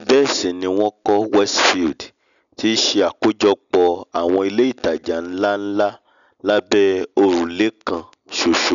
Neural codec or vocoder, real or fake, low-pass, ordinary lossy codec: none; real; 7.2 kHz; none